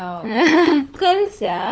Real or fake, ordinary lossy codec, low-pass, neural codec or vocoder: fake; none; none; codec, 16 kHz, 16 kbps, FunCodec, trained on LibriTTS, 50 frames a second